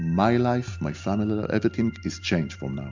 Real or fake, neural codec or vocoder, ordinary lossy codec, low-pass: real; none; MP3, 64 kbps; 7.2 kHz